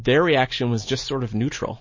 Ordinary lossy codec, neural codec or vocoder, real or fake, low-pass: MP3, 32 kbps; none; real; 7.2 kHz